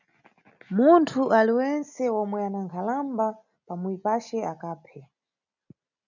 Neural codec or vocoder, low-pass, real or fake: none; 7.2 kHz; real